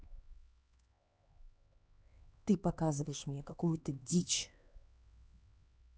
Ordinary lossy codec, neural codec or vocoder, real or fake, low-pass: none; codec, 16 kHz, 2 kbps, X-Codec, HuBERT features, trained on LibriSpeech; fake; none